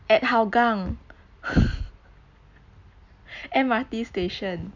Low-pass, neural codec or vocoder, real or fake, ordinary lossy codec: 7.2 kHz; none; real; none